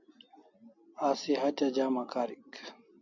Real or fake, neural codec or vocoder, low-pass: real; none; 7.2 kHz